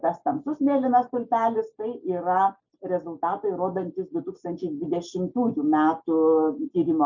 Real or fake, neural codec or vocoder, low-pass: real; none; 7.2 kHz